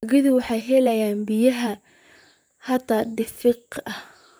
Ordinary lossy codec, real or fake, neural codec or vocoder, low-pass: none; fake; vocoder, 44.1 kHz, 128 mel bands, Pupu-Vocoder; none